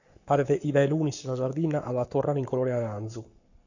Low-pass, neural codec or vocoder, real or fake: 7.2 kHz; codec, 44.1 kHz, 7.8 kbps, Pupu-Codec; fake